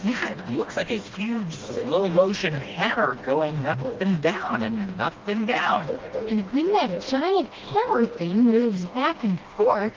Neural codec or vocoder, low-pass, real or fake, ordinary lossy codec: codec, 16 kHz, 1 kbps, FreqCodec, smaller model; 7.2 kHz; fake; Opus, 32 kbps